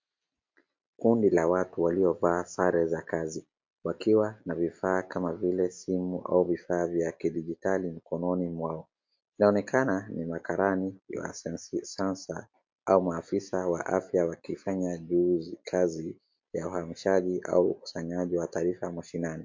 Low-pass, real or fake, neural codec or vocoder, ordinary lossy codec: 7.2 kHz; real; none; MP3, 48 kbps